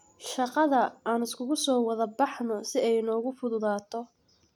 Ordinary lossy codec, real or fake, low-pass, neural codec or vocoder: none; real; 19.8 kHz; none